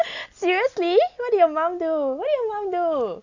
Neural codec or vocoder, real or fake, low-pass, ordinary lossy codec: none; real; 7.2 kHz; none